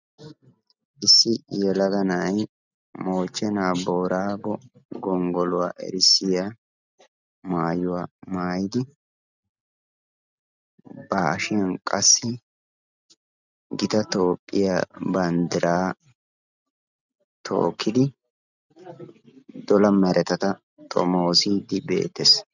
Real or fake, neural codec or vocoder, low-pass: real; none; 7.2 kHz